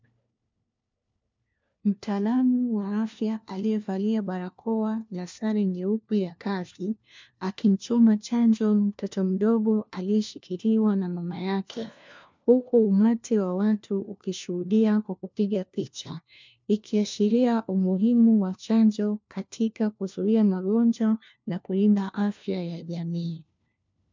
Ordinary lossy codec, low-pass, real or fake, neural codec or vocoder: MP3, 64 kbps; 7.2 kHz; fake; codec, 16 kHz, 1 kbps, FunCodec, trained on LibriTTS, 50 frames a second